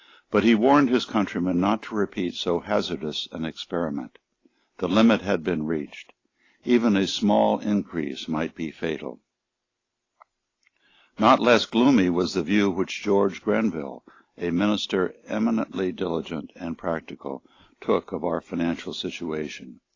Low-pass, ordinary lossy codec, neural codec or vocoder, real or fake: 7.2 kHz; AAC, 32 kbps; none; real